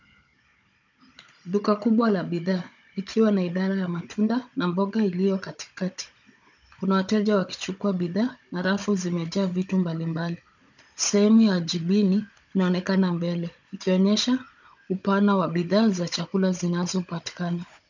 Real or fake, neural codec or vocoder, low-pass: fake; codec, 16 kHz, 16 kbps, FunCodec, trained on Chinese and English, 50 frames a second; 7.2 kHz